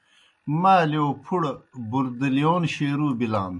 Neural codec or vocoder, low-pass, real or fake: none; 10.8 kHz; real